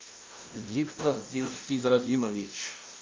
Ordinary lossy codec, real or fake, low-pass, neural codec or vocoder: Opus, 32 kbps; fake; 7.2 kHz; codec, 16 kHz, 0.5 kbps, FunCodec, trained on LibriTTS, 25 frames a second